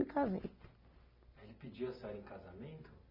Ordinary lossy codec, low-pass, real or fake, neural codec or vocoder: none; 5.4 kHz; real; none